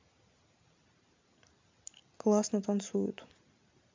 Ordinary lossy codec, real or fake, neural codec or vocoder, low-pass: MP3, 64 kbps; real; none; 7.2 kHz